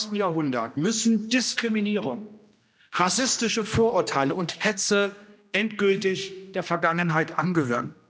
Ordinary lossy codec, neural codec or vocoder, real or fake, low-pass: none; codec, 16 kHz, 1 kbps, X-Codec, HuBERT features, trained on general audio; fake; none